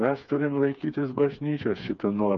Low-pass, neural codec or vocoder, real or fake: 7.2 kHz; codec, 16 kHz, 4 kbps, FreqCodec, smaller model; fake